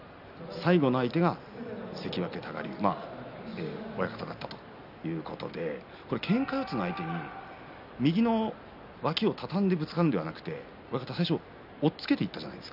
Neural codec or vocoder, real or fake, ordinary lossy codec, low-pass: none; real; none; 5.4 kHz